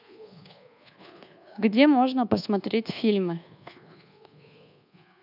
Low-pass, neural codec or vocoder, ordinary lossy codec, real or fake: 5.4 kHz; codec, 24 kHz, 1.2 kbps, DualCodec; none; fake